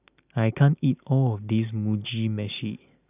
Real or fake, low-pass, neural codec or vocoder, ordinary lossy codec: real; 3.6 kHz; none; none